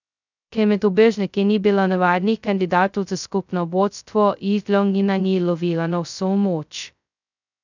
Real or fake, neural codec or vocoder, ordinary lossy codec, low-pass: fake; codec, 16 kHz, 0.2 kbps, FocalCodec; none; 7.2 kHz